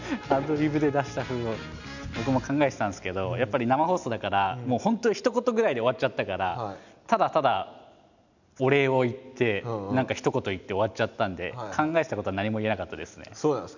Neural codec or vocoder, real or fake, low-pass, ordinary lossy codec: none; real; 7.2 kHz; none